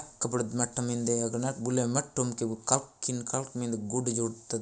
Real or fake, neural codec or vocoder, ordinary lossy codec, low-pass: real; none; none; none